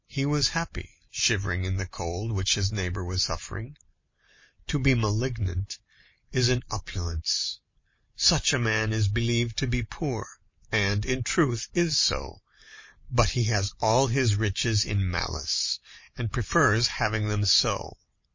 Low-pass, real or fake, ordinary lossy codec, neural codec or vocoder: 7.2 kHz; real; MP3, 32 kbps; none